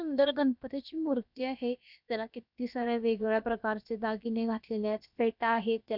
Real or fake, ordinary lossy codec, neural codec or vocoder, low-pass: fake; none; codec, 16 kHz, about 1 kbps, DyCAST, with the encoder's durations; 5.4 kHz